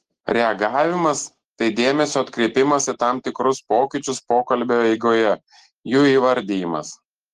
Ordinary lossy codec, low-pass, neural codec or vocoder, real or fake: Opus, 16 kbps; 14.4 kHz; none; real